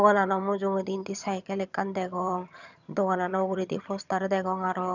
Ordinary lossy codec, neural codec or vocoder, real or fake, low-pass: none; vocoder, 22.05 kHz, 80 mel bands, HiFi-GAN; fake; 7.2 kHz